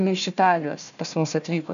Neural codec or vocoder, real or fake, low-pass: codec, 16 kHz, 1 kbps, FunCodec, trained on Chinese and English, 50 frames a second; fake; 7.2 kHz